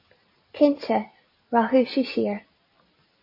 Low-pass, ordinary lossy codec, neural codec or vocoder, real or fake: 5.4 kHz; MP3, 24 kbps; none; real